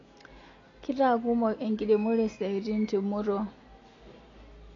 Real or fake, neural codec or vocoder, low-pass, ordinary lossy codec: real; none; 7.2 kHz; AAC, 32 kbps